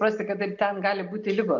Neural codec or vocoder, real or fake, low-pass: none; real; 7.2 kHz